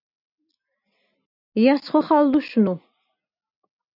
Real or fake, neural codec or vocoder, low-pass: real; none; 5.4 kHz